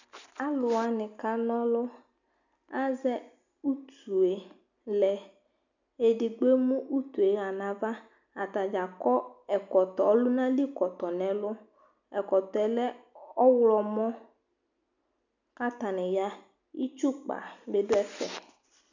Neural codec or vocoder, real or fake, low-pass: none; real; 7.2 kHz